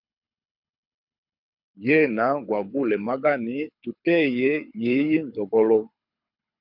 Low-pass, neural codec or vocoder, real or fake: 5.4 kHz; codec, 24 kHz, 6 kbps, HILCodec; fake